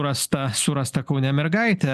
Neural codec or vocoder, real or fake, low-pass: none; real; 14.4 kHz